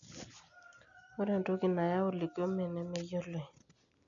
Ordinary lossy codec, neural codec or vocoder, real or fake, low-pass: none; none; real; 7.2 kHz